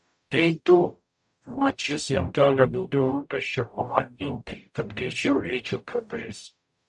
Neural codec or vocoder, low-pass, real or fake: codec, 44.1 kHz, 0.9 kbps, DAC; 10.8 kHz; fake